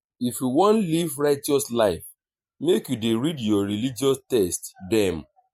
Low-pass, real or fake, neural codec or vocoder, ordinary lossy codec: 19.8 kHz; fake; vocoder, 44.1 kHz, 128 mel bands every 512 samples, BigVGAN v2; MP3, 64 kbps